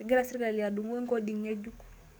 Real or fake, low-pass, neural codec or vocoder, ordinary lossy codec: fake; none; codec, 44.1 kHz, 7.8 kbps, DAC; none